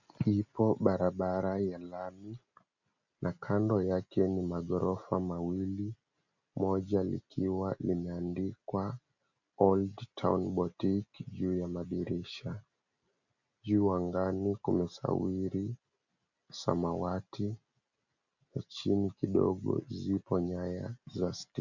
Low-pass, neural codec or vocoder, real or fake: 7.2 kHz; none; real